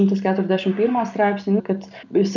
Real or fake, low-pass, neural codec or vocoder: real; 7.2 kHz; none